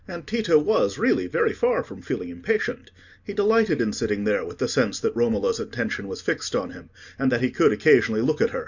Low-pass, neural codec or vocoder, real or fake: 7.2 kHz; none; real